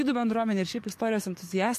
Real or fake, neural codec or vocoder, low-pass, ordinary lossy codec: fake; codec, 44.1 kHz, 7.8 kbps, DAC; 14.4 kHz; MP3, 64 kbps